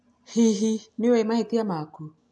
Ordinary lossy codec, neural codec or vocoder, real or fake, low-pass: none; none; real; none